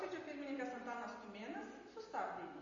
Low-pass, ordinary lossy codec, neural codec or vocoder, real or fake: 7.2 kHz; MP3, 32 kbps; none; real